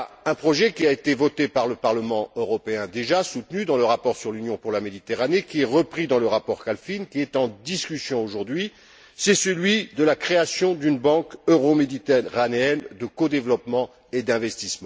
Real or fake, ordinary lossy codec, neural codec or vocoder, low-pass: real; none; none; none